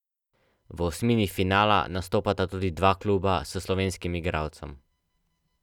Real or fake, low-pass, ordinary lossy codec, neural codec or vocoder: real; 19.8 kHz; none; none